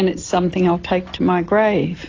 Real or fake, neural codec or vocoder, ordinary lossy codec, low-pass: real; none; AAC, 32 kbps; 7.2 kHz